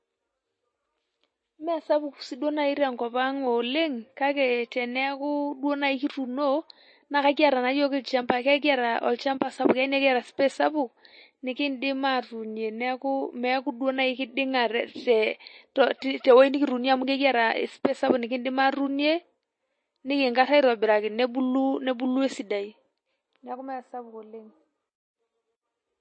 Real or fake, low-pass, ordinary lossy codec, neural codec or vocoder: real; 9.9 kHz; MP3, 32 kbps; none